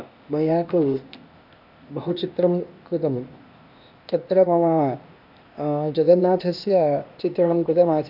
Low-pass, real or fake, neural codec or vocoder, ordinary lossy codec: 5.4 kHz; fake; codec, 16 kHz, 0.8 kbps, ZipCodec; AAC, 48 kbps